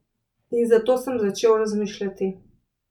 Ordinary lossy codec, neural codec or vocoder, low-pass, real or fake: none; none; 19.8 kHz; real